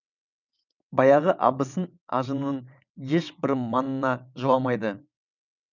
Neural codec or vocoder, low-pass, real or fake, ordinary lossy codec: vocoder, 22.05 kHz, 80 mel bands, WaveNeXt; 7.2 kHz; fake; none